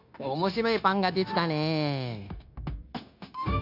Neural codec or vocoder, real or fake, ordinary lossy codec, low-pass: codec, 16 kHz, 0.9 kbps, LongCat-Audio-Codec; fake; none; 5.4 kHz